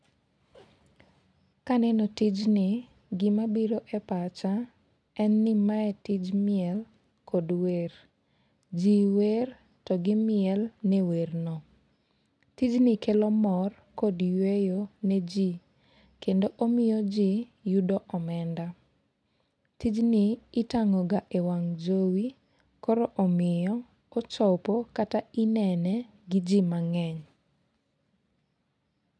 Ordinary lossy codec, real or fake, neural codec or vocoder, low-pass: none; real; none; none